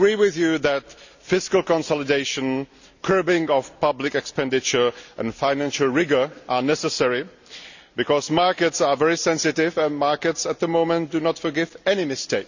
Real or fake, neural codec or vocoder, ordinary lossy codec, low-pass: real; none; none; 7.2 kHz